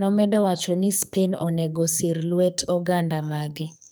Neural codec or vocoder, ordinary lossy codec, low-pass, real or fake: codec, 44.1 kHz, 2.6 kbps, SNAC; none; none; fake